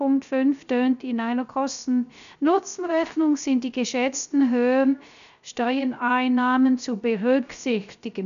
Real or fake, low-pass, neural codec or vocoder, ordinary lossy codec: fake; 7.2 kHz; codec, 16 kHz, 0.3 kbps, FocalCodec; none